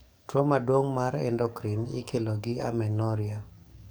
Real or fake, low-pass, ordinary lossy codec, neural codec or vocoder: fake; none; none; codec, 44.1 kHz, 7.8 kbps, DAC